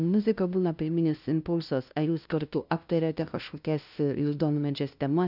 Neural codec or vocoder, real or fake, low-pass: codec, 16 kHz, 0.5 kbps, FunCodec, trained on LibriTTS, 25 frames a second; fake; 5.4 kHz